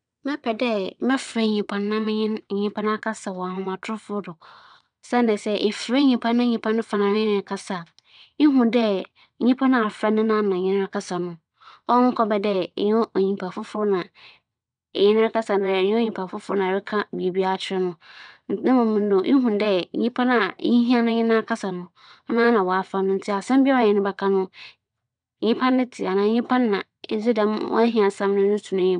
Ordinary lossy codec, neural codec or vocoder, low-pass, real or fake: none; vocoder, 22.05 kHz, 80 mel bands, WaveNeXt; 9.9 kHz; fake